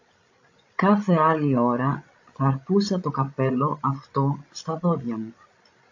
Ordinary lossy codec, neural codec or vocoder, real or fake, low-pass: AAC, 48 kbps; codec, 16 kHz, 16 kbps, FreqCodec, larger model; fake; 7.2 kHz